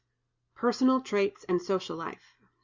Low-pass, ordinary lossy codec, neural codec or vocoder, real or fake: 7.2 kHz; Opus, 64 kbps; none; real